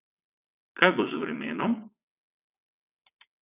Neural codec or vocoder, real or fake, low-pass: vocoder, 22.05 kHz, 80 mel bands, WaveNeXt; fake; 3.6 kHz